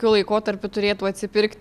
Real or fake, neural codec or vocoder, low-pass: real; none; 14.4 kHz